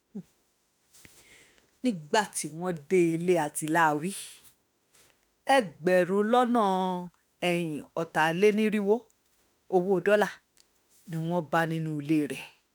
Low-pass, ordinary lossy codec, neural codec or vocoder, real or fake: none; none; autoencoder, 48 kHz, 32 numbers a frame, DAC-VAE, trained on Japanese speech; fake